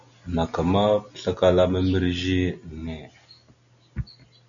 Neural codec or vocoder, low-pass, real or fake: none; 7.2 kHz; real